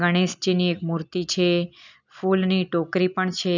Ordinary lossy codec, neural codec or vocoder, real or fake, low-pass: none; none; real; 7.2 kHz